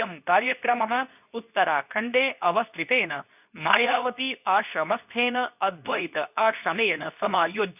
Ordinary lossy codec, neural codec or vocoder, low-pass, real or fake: none; codec, 24 kHz, 0.9 kbps, WavTokenizer, medium speech release version 2; 3.6 kHz; fake